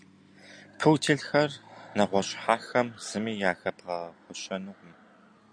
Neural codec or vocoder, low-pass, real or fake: none; 9.9 kHz; real